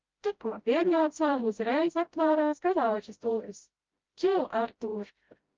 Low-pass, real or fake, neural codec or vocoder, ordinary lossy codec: 7.2 kHz; fake; codec, 16 kHz, 0.5 kbps, FreqCodec, smaller model; Opus, 24 kbps